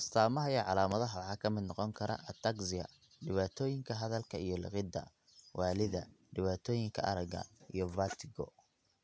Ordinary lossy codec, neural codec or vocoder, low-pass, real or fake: none; none; none; real